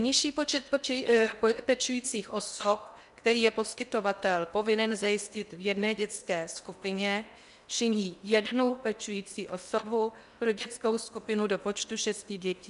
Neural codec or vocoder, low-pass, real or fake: codec, 16 kHz in and 24 kHz out, 0.8 kbps, FocalCodec, streaming, 65536 codes; 10.8 kHz; fake